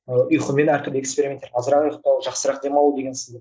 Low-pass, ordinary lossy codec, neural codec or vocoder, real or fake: none; none; none; real